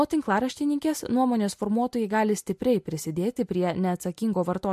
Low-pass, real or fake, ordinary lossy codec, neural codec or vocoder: 14.4 kHz; real; MP3, 64 kbps; none